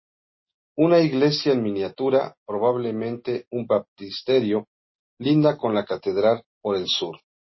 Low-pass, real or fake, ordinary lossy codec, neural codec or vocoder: 7.2 kHz; real; MP3, 24 kbps; none